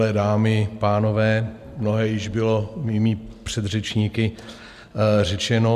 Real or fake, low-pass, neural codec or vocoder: real; 14.4 kHz; none